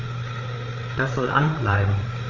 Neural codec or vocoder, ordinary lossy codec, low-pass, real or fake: codec, 16 kHz, 8 kbps, FreqCodec, larger model; Opus, 64 kbps; 7.2 kHz; fake